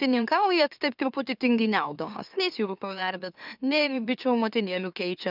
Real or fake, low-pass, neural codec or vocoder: fake; 5.4 kHz; autoencoder, 44.1 kHz, a latent of 192 numbers a frame, MeloTTS